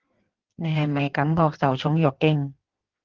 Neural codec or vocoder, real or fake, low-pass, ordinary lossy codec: codec, 16 kHz in and 24 kHz out, 1.1 kbps, FireRedTTS-2 codec; fake; 7.2 kHz; Opus, 16 kbps